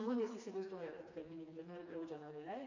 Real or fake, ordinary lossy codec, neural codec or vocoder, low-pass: fake; AAC, 48 kbps; codec, 16 kHz, 2 kbps, FreqCodec, smaller model; 7.2 kHz